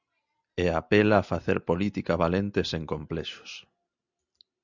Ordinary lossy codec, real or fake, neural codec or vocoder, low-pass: Opus, 64 kbps; real; none; 7.2 kHz